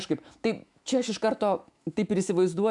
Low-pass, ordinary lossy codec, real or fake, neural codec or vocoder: 10.8 kHz; MP3, 96 kbps; fake; autoencoder, 48 kHz, 128 numbers a frame, DAC-VAE, trained on Japanese speech